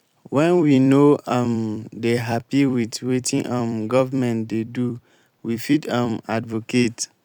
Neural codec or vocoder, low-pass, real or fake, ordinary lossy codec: vocoder, 44.1 kHz, 128 mel bands every 256 samples, BigVGAN v2; 19.8 kHz; fake; none